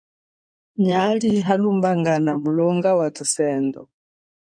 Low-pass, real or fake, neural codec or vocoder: 9.9 kHz; fake; codec, 16 kHz in and 24 kHz out, 2.2 kbps, FireRedTTS-2 codec